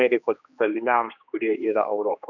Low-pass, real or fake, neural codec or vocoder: 7.2 kHz; fake; codec, 16 kHz, 2 kbps, X-Codec, HuBERT features, trained on general audio